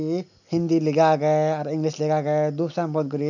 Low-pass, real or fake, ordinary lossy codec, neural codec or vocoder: 7.2 kHz; real; none; none